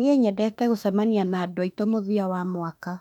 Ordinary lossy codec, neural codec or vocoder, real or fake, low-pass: none; autoencoder, 48 kHz, 32 numbers a frame, DAC-VAE, trained on Japanese speech; fake; 19.8 kHz